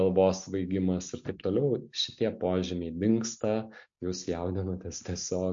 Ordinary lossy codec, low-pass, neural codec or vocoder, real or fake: MP3, 48 kbps; 7.2 kHz; none; real